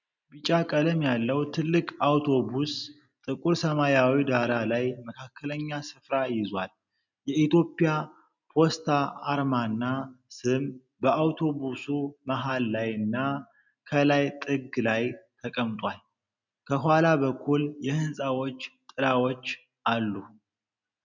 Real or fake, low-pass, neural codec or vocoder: real; 7.2 kHz; none